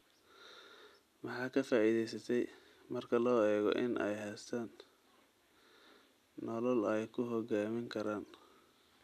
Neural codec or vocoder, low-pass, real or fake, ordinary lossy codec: none; 14.4 kHz; real; none